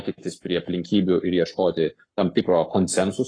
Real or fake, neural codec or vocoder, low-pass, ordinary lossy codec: fake; codec, 44.1 kHz, 7.8 kbps, Pupu-Codec; 9.9 kHz; AAC, 32 kbps